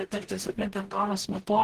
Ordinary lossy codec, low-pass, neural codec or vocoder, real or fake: Opus, 16 kbps; 14.4 kHz; codec, 44.1 kHz, 0.9 kbps, DAC; fake